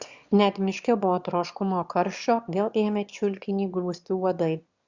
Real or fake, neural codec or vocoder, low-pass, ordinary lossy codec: fake; autoencoder, 22.05 kHz, a latent of 192 numbers a frame, VITS, trained on one speaker; 7.2 kHz; Opus, 64 kbps